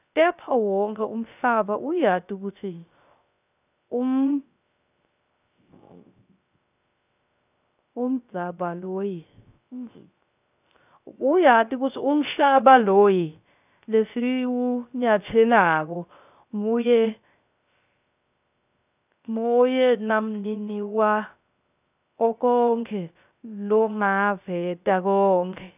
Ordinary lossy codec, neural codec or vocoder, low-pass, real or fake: none; codec, 16 kHz, 0.3 kbps, FocalCodec; 3.6 kHz; fake